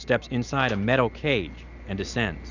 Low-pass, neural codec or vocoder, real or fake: 7.2 kHz; none; real